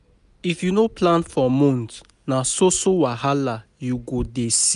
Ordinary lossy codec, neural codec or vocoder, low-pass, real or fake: none; none; 10.8 kHz; real